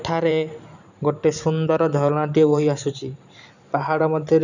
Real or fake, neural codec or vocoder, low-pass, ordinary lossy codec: fake; vocoder, 44.1 kHz, 128 mel bands every 512 samples, BigVGAN v2; 7.2 kHz; none